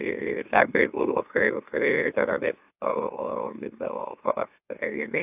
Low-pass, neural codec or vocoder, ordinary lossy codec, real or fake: 3.6 kHz; autoencoder, 44.1 kHz, a latent of 192 numbers a frame, MeloTTS; AAC, 32 kbps; fake